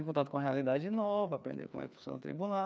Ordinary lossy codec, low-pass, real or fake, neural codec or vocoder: none; none; fake; codec, 16 kHz, 2 kbps, FreqCodec, larger model